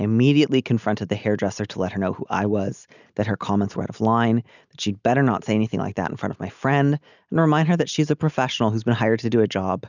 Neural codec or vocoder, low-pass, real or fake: none; 7.2 kHz; real